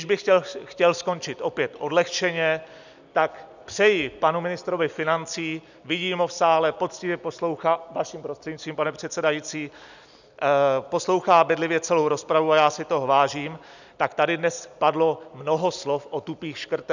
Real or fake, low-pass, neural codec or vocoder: real; 7.2 kHz; none